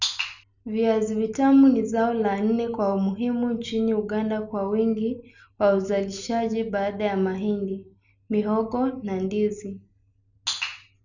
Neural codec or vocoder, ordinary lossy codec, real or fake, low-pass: none; none; real; 7.2 kHz